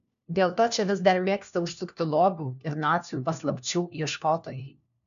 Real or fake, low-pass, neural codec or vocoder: fake; 7.2 kHz; codec, 16 kHz, 1 kbps, FunCodec, trained on LibriTTS, 50 frames a second